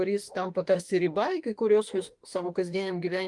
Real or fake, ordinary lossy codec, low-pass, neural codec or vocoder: fake; Opus, 24 kbps; 10.8 kHz; autoencoder, 48 kHz, 32 numbers a frame, DAC-VAE, trained on Japanese speech